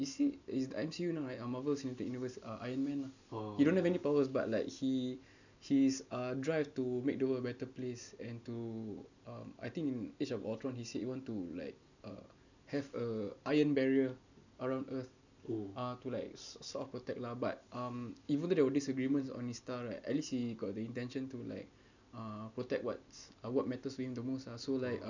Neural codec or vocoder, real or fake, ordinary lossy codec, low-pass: none; real; none; 7.2 kHz